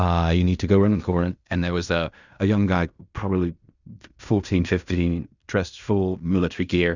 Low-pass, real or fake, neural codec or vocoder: 7.2 kHz; fake; codec, 16 kHz in and 24 kHz out, 0.4 kbps, LongCat-Audio-Codec, fine tuned four codebook decoder